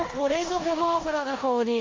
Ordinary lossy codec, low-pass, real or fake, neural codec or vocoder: Opus, 32 kbps; 7.2 kHz; fake; codec, 16 kHz in and 24 kHz out, 0.9 kbps, LongCat-Audio-Codec, four codebook decoder